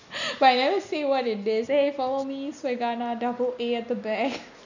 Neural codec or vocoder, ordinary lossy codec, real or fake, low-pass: none; none; real; 7.2 kHz